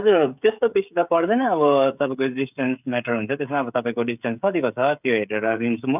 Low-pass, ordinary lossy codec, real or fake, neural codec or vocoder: 3.6 kHz; none; fake; codec, 16 kHz, 16 kbps, FreqCodec, smaller model